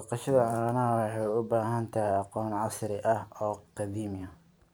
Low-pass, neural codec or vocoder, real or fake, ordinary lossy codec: none; vocoder, 44.1 kHz, 128 mel bands every 512 samples, BigVGAN v2; fake; none